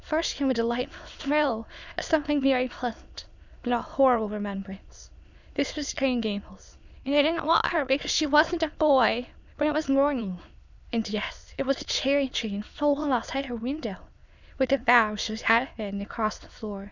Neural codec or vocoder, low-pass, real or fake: autoencoder, 22.05 kHz, a latent of 192 numbers a frame, VITS, trained on many speakers; 7.2 kHz; fake